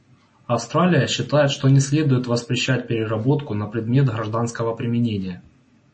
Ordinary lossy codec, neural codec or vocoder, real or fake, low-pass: MP3, 32 kbps; none; real; 9.9 kHz